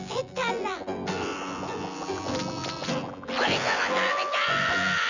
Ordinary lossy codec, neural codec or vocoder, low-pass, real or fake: none; vocoder, 24 kHz, 100 mel bands, Vocos; 7.2 kHz; fake